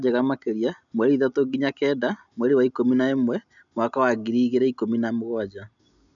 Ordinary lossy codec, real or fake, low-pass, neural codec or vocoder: MP3, 96 kbps; real; 7.2 kHz; none